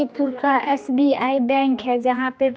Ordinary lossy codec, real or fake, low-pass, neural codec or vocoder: none; fake; none; codec, 16 kHz, 2 kbps, X-Codec, HuBERT features, trained on general audio